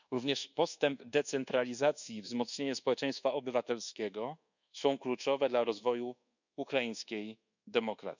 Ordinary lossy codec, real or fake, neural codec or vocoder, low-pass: none; fake; codec, 24 kHz, 1.2 kbps, DualCodec; 7.2 kHz